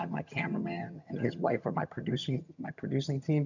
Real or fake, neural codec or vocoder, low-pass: fake; vocoder, 22.05 kHz, 80 mel bands, HiFi-GAN; 7.2 kHz